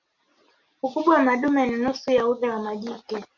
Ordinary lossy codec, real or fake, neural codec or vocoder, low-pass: MP3, 48 kbps; real; none; 7.2 kHz